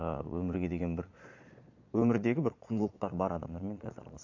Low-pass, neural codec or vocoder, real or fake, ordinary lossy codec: 7.2 kHz; vocoder, 22.05 kHz, 80 mel bands, Vocos; fake; none